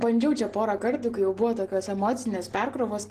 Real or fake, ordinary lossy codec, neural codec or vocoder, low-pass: real; Opus, 16 kbps; none; 14.4 kHz